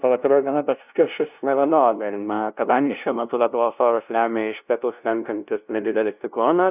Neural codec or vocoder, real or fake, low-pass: codec, 16 kHz, 0.5 kbps, FunCodec, trained on LibriTTS, 25 frames a second; fake; 3.6 kHz